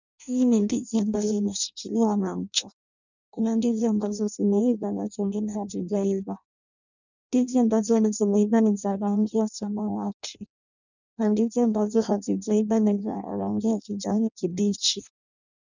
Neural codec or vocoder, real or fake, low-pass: codec, 16 kHz in and 24 kHz out, 0.6 kbps, FireRedTTS-2 codec; fake; 7.2 kHz